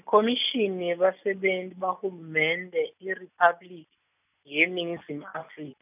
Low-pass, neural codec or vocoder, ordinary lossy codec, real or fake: 3.6 kHz; none; none; real